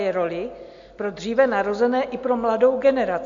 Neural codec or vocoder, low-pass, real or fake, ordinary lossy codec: none; 7.2 kHz; real; AAC, 64 kbps